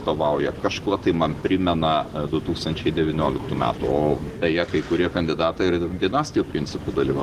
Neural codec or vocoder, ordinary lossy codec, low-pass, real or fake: none; Opus, 16 kbps; 14.4 kHz; real